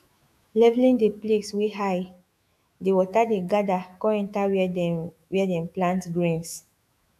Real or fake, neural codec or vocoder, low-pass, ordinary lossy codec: fake; autoencoder, 48 kHz, 128 numbers a frame, DAC-VAE, trained on Japanese speech; 14.4 kHz; AAC, 96 kbps